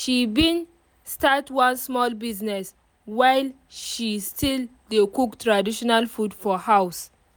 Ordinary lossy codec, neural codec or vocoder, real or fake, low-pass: none; none; real; none